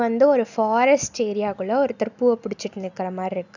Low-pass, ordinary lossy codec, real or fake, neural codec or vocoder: 7.2 kHz; none; real; none